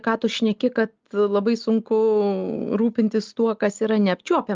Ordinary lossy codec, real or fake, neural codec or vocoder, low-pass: Opus, 32 kbps; real; none; 7.2 kHz